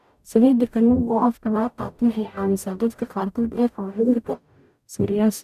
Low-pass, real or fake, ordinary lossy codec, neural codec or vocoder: 14.4 kHz; fake; none; codec, 44.1 kHz, 0.9 kbps, DAC